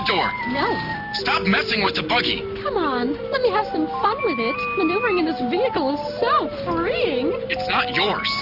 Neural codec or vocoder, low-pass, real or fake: none; 5.4 kHz; real